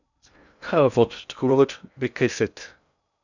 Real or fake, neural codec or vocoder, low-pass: fake; codec, 16 kHz in and 24 kHz out, 0.6 kbps, FocalCodec, streaming, 2048 codes; 7.2 kHz